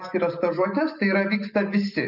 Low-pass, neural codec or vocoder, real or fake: 5.4 kHz; none; real